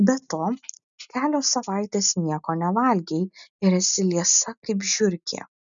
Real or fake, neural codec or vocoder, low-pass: real; none; 7.2 kHz